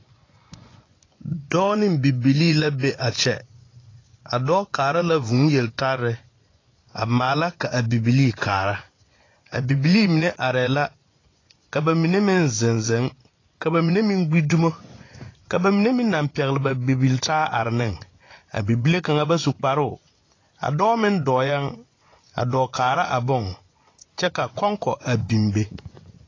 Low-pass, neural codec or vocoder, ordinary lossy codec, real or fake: 7.2 kHz; vocoder, 24 kHz, 100 mel bands, Vocos; AAC, 32 kbps; fake